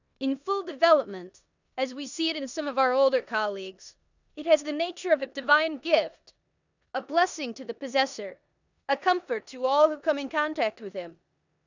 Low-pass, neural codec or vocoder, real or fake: 7.2 kHz; codec, 16 kHz in and 24 kHz out, 0.9 kbps, LongCat-Audio-Codec, four codebook decoder; fake